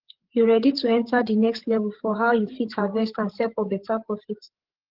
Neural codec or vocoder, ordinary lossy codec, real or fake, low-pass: codec, 16 kHz, 8 kbps, FreqCodec, larger model; Opus, 16 kbps; fake; 5.4 kHz